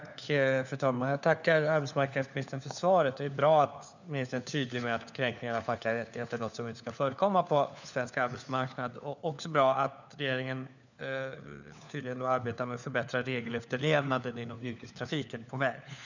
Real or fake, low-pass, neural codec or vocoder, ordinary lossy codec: fake; 7.2 kHz; codec, 16 kHz, 4 kbps, FunCodec, trained on LibriTTS, 50 frames a second; none